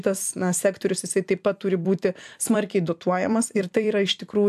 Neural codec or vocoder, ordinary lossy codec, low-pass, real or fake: vocoder, 44.1 kHz, 128 mel bands every 256 samples, BigVGAN v2; AAC, 96 kbps; 14.4 kHz; fake